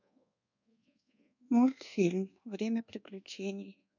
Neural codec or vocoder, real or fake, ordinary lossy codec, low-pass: codec, 24 kHz, 1.2 kbps, DualCodec; fake; none; 7.2 kHz